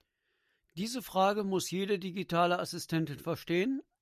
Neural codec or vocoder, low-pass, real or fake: none; 14.4 kHz; real